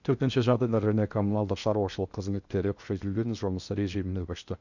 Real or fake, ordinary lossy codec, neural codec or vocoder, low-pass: fake; none; codec, 16 kHz in and 24 kHz out, 0.6 kbps, FocalCodec, streaming, 2048 codes; 7.2 kHz